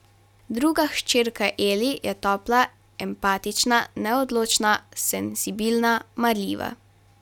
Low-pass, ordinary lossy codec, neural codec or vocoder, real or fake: 19.8 kHz; none; none; real